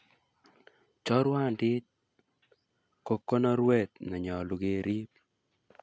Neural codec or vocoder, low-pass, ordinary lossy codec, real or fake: none; none; none; real